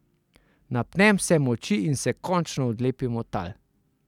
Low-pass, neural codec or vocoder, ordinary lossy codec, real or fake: 19.8 kHz; none; none; real